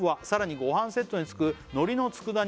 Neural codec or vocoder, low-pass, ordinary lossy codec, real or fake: none; none; none; real